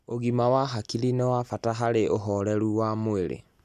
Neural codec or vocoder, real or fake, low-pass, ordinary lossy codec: none; real; 14.4 kHz; none